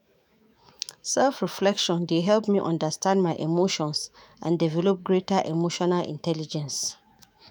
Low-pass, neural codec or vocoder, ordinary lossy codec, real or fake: none; autoencoder, 48 kHz, 128 numbers a frame, DAC-VAE, trained on Japanese speech; none; fake